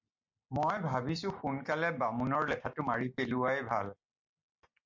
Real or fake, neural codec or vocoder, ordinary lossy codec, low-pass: real; none; MP3, 48 kbps; 7.2 kHz